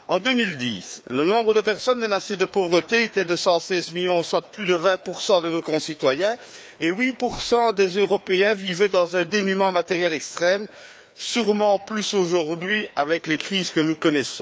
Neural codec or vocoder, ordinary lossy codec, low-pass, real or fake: codec, 16 kHz, 2 kbps, FreqCodec, larger model; none; none; fake